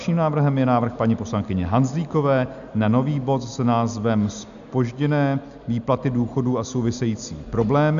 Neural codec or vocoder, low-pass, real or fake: none; 7.2 kHz; real